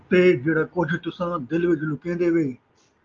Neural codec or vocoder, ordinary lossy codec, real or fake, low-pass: none; Opus, 24 kbps; real; 7.2 kHz